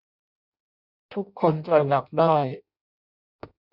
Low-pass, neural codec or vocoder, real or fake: 5.4 kHz; codec, 16 kHz in and 24 kHz out, 0.6 kbps, FireRedTTS-2 codec; fake